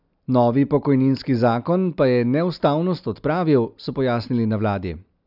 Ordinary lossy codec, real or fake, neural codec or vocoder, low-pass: none; real; none; 5.4 kHz